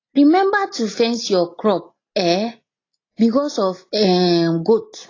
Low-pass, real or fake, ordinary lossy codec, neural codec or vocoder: 7.2 kHz; real; AAC, 32 kbps; none